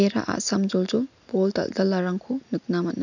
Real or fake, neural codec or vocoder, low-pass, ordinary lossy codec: real; none; 7.2 kHz; none